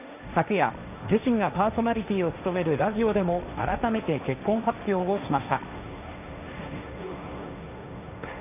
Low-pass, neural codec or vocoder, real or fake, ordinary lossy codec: 3.6 kHz; codec, 16 kHz, 1.1 kbps, Voila-Tokenizer; fake; none